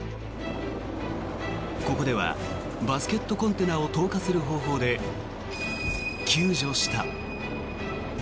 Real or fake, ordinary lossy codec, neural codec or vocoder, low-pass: real; none; none; none